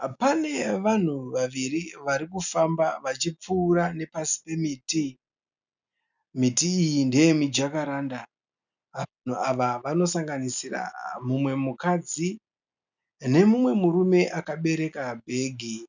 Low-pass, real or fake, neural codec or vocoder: 7.2 kHz; real; none